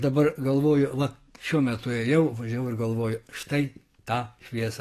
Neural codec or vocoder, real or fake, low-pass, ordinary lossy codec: codec, 44.1 kHz, 7.8 kbps, DAC; fake; 14.4 kHz; AAC, 48 kbps